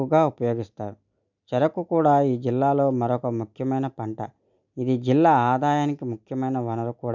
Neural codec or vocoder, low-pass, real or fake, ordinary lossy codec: none; 7.2 kHz; real; none